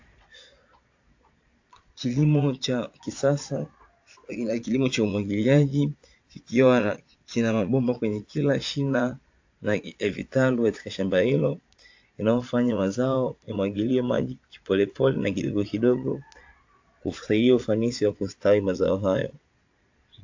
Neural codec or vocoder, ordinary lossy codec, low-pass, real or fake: vocoder, 22.05 kHz, 80 mel bands, Vocos; AAC, 48 kbps; 7.2 kHz; fake